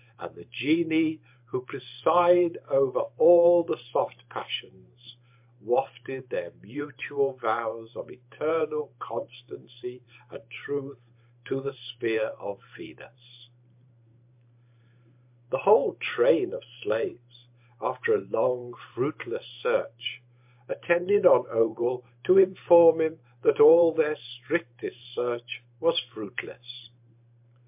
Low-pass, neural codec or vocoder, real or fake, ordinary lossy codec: 3.6 kHz; vocoder, 44.1 kHz, 128 mel bands every 512 samples, BigVGAN v2; fake; MP3, 32 kbps